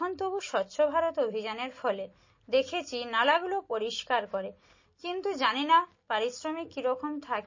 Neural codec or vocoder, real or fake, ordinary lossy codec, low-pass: none; real; MP3, 32 kbps; 7.2 kHz